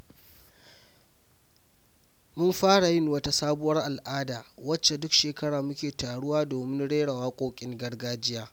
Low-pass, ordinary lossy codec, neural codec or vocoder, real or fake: none; none; none; real